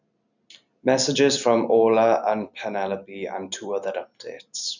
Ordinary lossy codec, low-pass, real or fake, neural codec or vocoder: none; 7.2 kHz; real; none